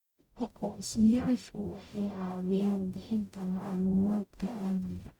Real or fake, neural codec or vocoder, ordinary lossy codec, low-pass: fake; codec, 44.1 kHz, 0.9 kbps, DAC; Opus, 64 kbps; 19.8 kHz